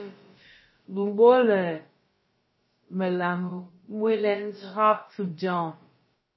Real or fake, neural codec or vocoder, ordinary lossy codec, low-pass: fake; codec, 16 kHz, about 1 kbps, DyCAST, with the encoder's durations; MP3, 24 kbps; 7.2 kHz